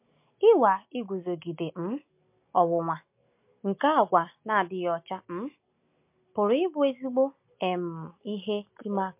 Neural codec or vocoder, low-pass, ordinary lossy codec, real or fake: none; 3.6 kHz; MP3, 32 kbps; real